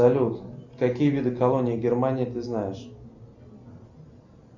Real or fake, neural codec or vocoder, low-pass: real; none; 7.2 kHz